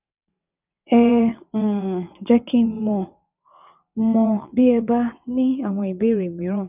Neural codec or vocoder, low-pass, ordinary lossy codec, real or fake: vocoder, 22.05 kHz, 80 mel bands, WaveNeXt; 3.6 kHz; none; fake